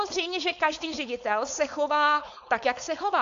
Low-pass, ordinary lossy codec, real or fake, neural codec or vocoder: 7.2 kHz; AAC, 64 kbps; fake; codec, 16 kHz, 4.8 kbps, FACodec